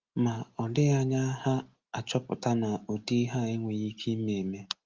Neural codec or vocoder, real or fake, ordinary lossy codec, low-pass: none; real; Opus, 32 kbps; 7.2 kHz